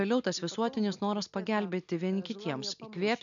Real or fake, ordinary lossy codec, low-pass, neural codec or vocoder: real; MP3, 96 kbps; 7.2 kHz; none